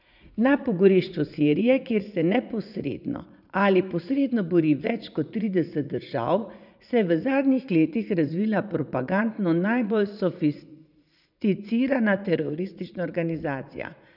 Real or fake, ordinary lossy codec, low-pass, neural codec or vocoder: fake; none; 5.4 kHz; vocoder, 44.1 kHz, 80 mel bands, Vocos